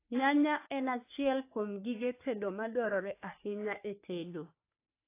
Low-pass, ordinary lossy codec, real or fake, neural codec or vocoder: 3.6 kHz; AAC, 24 kbps; fake; codec, 44.1 kHz, 3.4 kbps, Pupu-Codec